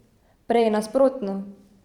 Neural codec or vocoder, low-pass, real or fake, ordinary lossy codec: vocoder, 44.1 kHz, 128 mel bands every 512 samples, BigVGAN v2; 19.8 kHz; fake; Opus, 64 kbps